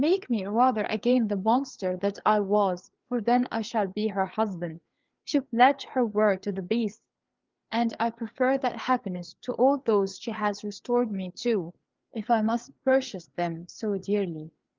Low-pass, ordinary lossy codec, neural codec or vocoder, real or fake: 7.2 kHz; Opus, 32 kbps; codec, 16 kHz, 4 kbps, FreqCodec, larger model; fake